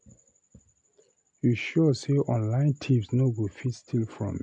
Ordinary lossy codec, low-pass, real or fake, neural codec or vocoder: none; 9.9 kHz; real; none